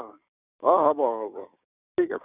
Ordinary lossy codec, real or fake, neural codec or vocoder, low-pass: none; fake; codec, 16 kHz, 6 kbps, DAC; 3.6 kHz